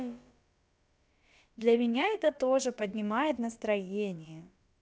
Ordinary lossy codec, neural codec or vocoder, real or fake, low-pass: none; codec, 16 kHz, about 1 kbps, DyCAST, with the encoder's durations; fake; none